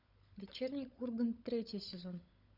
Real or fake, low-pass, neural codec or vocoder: fake; 5.4 kHz; codec, 16 kHz, 16 kbps, FunCodec, trained on LibriTTS, 50 frames a second